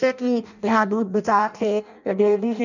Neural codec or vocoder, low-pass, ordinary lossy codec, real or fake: codec, 16 kHz in and 24 kHz out, 0.6 kbps, FireRedTTS-2 codec; 7.2 kHz; none; fake